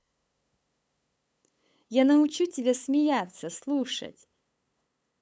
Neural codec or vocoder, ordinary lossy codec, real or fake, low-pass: codec, 16 kHz, 8 kbps, FunCodec, trained on LibriTTS, 25 frames a second; none; fake; none